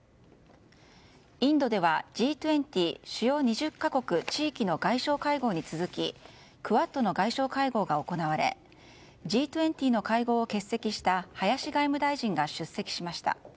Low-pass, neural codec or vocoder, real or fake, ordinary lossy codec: none; none; real; none